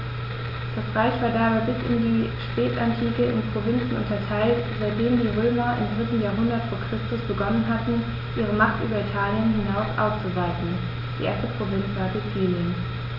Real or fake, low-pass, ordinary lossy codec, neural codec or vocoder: real; 5.4 kHz; none; none